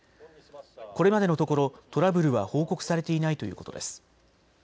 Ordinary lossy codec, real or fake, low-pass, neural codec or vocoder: none; real; none; none